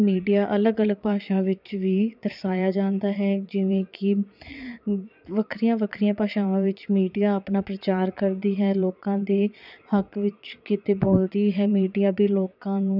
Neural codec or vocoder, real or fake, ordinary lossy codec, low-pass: vocoder, 22.05 kHz, 80 mel bands, Vocos; fake; none; 5.4 kHz